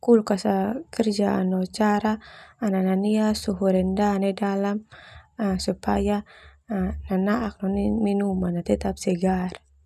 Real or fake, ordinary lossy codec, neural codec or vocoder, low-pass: real; none; none; 14.4 kHz